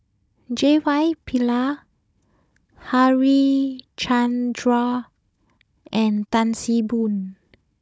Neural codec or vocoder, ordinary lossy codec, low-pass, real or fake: codec, 16 kHz, 16 kbps, FunCodec, trained on Chinese and English, 50 frames a second; none; none; fake